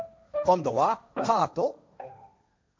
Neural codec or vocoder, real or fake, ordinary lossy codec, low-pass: codec, 16 kHz, 1.1 kbps, Voila-Tokenizer; fake; none; none